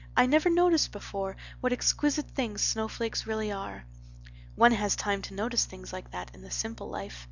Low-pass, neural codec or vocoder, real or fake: 7.2 kHz; none; real